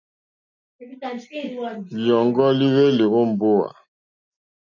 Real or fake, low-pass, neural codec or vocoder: real; 7.2 kHz; none